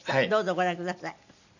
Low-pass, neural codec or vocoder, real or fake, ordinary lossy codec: 7.2 kHz; none; real; none